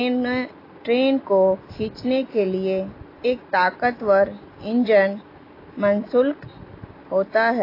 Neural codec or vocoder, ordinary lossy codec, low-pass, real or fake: none; AAC, 24 kbps; 5.4 kHz; real